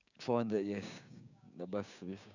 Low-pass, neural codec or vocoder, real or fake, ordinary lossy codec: 7.2 kHz; codec, 16 kHz, 6 kbps, DAC; fake; none